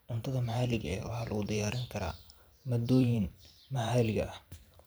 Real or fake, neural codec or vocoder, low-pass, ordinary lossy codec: fake; vocoder, 44.1 kHz, 128 mel bands every 512 samples, BigVGAN v2; none; none